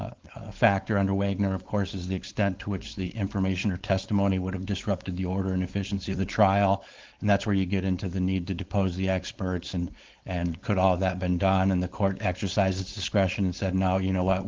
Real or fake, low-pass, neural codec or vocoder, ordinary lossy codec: fake; 7.2 kHz; codec, 16 kHz, 4.8 kbps, FACodec; Opus, 16 kbps